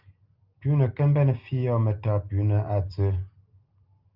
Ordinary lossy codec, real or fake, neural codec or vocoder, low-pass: Opus, 32 kbps; real; none; 5.4 kHz